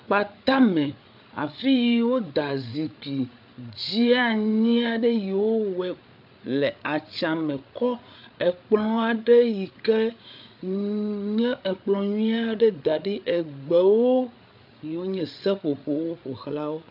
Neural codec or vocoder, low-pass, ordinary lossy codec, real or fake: codec, 16 kHz, 8 kbps, FreqCodec, larger model; 5.4 kHz; AAC, 48 kbps; fake